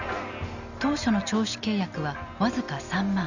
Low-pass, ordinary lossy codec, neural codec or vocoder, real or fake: 7.2 kHz; none; none; real